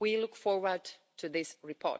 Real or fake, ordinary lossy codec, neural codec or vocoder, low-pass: real; none; none; none